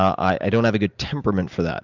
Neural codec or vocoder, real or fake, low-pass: none; real; 7.2 kHz